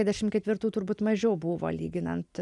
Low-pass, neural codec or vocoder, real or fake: 10.8 kHz; none; real